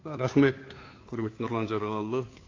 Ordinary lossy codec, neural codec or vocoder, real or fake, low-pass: AAC, 32 kbps; codec, 16 kHz, 2 kbps, FunCodec, trained on Chinese and English, 25 frames a second; fake; 7.2 kHz